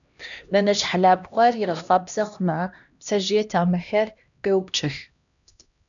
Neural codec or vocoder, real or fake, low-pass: codec, 16 kHz, 1 kbps, X-Codec, HuBERT features, trained on LibriSpeech; fake; 7.2 kHz